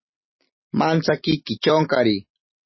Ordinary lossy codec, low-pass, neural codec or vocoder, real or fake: MP3, 24 kbps; 7.2 kHz; none; real